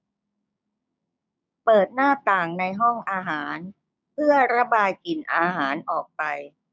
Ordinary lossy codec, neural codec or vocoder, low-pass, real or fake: none; codec, 16 kHz, 6 kbps, DAC; none; fake